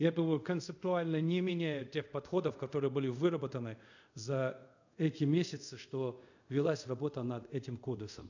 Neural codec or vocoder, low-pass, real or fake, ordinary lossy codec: codec, 24 kHz, 0.5 kbps, DualCodec; 7.2 kHz; fake; none